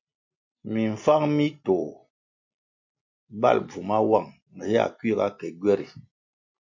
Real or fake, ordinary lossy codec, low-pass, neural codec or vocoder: real; MP3, 48 kbps; 7.2 kHz; none